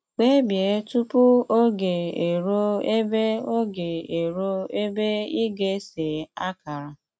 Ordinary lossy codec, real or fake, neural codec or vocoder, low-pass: none; real; none; none